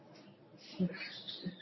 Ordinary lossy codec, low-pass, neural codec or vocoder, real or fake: MP3, 24 kbps; 7.2 kHz; codec, 24 kHz, 0.9 kbps, WavTokenizer, medium speech release version 1; fake